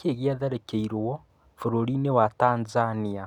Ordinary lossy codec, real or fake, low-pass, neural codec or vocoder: none; real; 19.8 kHz; none